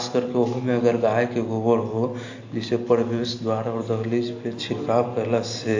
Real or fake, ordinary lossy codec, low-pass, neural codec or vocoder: real; AAC, 48 kbps; 7.2 kHz; none